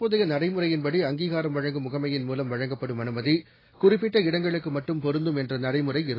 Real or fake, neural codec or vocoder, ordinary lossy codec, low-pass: real; none; AAC, 24 kbps; 5.4 kHz